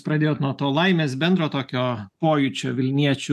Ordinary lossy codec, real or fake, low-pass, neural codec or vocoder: AAC, 96 kbps; real; 14.4 kHz; none